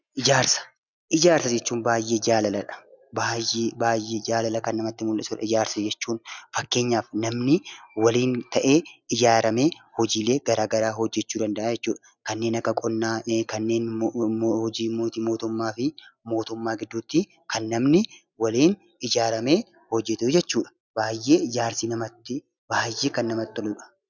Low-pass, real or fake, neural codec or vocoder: 7.2 kHz; real; none